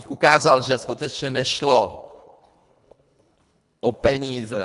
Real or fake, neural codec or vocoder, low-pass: fake; codec, 24 kHz, 1.5 kbps, HILCodec; 10.8 kHz